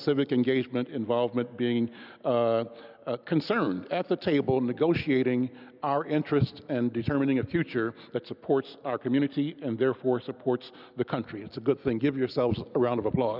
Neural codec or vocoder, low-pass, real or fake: none; 5.4 kHz; real